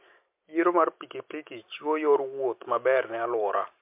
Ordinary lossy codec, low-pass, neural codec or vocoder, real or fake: MP3, 32 kbps; 3.6 kHz; none; real